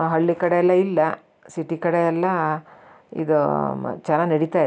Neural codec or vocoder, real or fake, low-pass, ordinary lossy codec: none; real; none; none